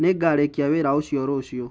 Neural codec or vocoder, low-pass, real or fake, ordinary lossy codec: none; none; real; none